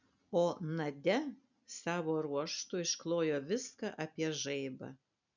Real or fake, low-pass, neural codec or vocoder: real; 7.2 kHz; none